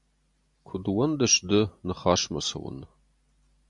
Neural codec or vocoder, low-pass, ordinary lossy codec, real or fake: none; 10.8 kHz; MP3, 64 kbps; real